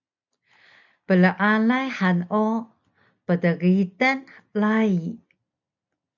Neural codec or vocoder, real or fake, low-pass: none; real; 7.2 kHz